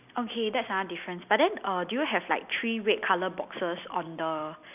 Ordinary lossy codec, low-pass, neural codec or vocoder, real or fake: none; 3.6 kHz; none; real